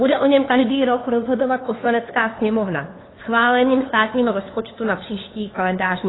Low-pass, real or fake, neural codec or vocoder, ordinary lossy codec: 7.2 kHz; fake; codec, 16 kHz, 2 kbps, FunCodec, trained on LibriTTS, 25 frames a second; AAC, 16 kbps